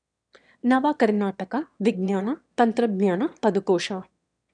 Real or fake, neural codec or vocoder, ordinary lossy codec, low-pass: fake; autoencoder, 22.05 kHz, a latent of 192 numbers a frame, VITS, trained on one speaker; none; 9.9 kHz